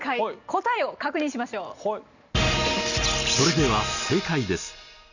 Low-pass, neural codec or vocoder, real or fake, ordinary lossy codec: 7.2 kHz; none; real; none